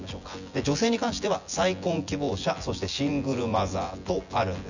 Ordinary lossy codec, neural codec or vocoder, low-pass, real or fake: none; vocoder, 24 kHz, 100 mel bands, Vocos; 7.2 kHz; fake